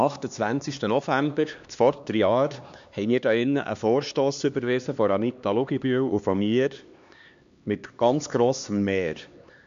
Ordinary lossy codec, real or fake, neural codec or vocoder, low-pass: MP3, 64 kbps; fake; codec, 16 kHz, 2 kbps, X-Codec, HuBERT features, trained on LibriSpeech; 7.2 kHz